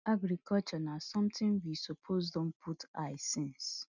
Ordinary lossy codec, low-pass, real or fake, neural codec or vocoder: none; 7.2 kHz; real; none